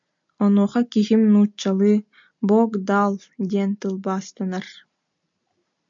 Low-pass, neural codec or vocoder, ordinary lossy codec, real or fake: 7.2 kHz; none; MP3, 64 kbps; real